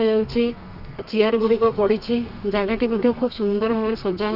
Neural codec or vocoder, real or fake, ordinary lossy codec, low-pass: codec, 32 kHz, 1.9 kbps, SNAC; fake; none; 5.4 kHz